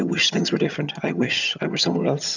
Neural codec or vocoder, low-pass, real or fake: vocoder, 22.05 kHz, 80 mel bands, HiFi-GAN; 7.2 kHz; fake